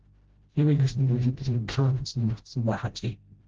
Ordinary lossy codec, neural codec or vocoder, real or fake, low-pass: Opus, 16 kbps; codec, 16 kHz, 0.5 kbps, FreqCodec, smaller model; fake; 7.2 kHz